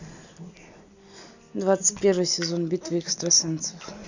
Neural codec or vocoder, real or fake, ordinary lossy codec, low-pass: none; real; none; 7.2 kHz